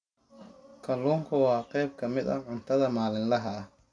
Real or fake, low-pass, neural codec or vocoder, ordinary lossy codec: real; 9.9 kHz; none; none